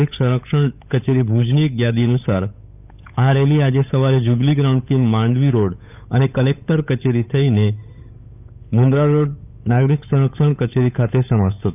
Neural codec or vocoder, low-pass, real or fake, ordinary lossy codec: codec, 16 kHz, 8 kbps, FunCodec, trained on Chinese and English, 25 frames a second; 3.6 kHz; fake; none